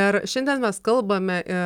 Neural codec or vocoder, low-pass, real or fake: none; 19.8 kHz; real